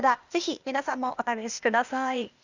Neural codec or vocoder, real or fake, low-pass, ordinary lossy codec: codec, 16 kHz, 0.8 kbps, ZipCodec; fake; 7.2 kHz; Opus, 64 kbps